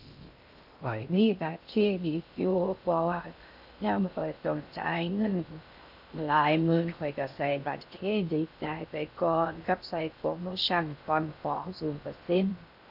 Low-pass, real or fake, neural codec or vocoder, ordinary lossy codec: 5.4 kHz; fake; codec, 16 kHz in and 24 kHz out, 0.6 kbps, FocalCodec, streaming, 2048 codes; none